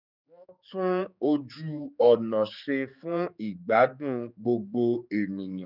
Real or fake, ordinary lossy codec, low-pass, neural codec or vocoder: fake; none; 5.4 kHz; codec, 16 kHz, 4 kbps, X-Codec, HuBERT features, trained on general audio